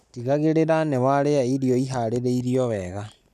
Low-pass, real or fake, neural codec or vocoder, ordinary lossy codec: 14.4 kHz; real; none; none